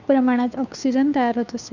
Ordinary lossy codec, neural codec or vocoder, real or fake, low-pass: none; codec, 16 kHz, 2 kbps, FunCodec, trained on Chinese and English, 25 frames a second; fake; 7.2 kHz